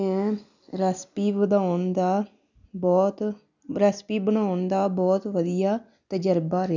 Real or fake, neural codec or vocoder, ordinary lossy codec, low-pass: real; none; none; 7.2 kHz